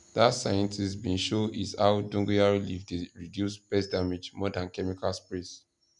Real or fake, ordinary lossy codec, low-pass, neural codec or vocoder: real; none; 10.8 kHz; none